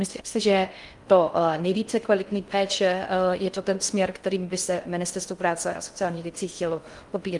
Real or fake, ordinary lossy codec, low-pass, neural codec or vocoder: fake; Opus, 32 kbps; 10.8 kHz; codec, 16 kHz in and 24 kHz out, 0.6 kbps, FocalCodec, streaming, 4096 codes